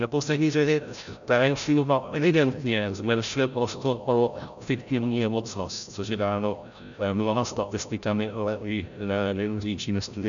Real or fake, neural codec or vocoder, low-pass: fake; codec, 16 kHz, 0.5 kbps, FreqCodec, larger model; 7.2 kHz